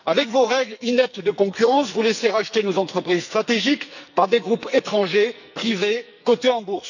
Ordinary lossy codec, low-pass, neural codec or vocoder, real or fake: none; 7.2 kHz; codec, 44.1 kHz, 2.6 kbps, SNAC; fake